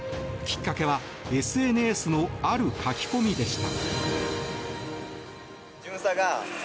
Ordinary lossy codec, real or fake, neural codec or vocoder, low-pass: none; real; none; none